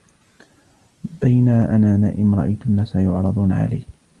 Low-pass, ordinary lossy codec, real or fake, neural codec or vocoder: 10.8 kHz; Opus, 32 kbps; real; none